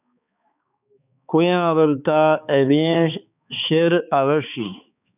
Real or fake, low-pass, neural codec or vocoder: fake; 3.6 kHz; codec, 16 kHz, 4 kbps, X-Codec, HuBERT features, trained on balanced general audio